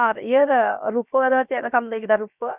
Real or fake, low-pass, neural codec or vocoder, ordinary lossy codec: fake; 3.6 kHz; codec, 16 kHz, about 1 kbps, DyCAST, with the encoder's durations; none